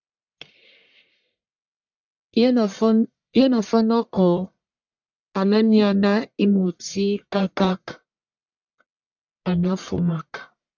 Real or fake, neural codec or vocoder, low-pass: fake; codec, 44.1 kHz, 1.7 kbps, Pupu-Codec; 7.2 kHz